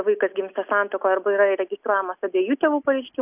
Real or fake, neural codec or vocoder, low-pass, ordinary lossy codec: real; none; 3.6 kHz; AAC, 32 kbps